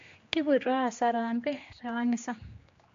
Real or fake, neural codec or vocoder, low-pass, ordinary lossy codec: fake; codec, 16 kHz, 2 kbps, X-Codec, HuBERT features, trained on general audio; 7.2 kHz; MP3, 48 kbps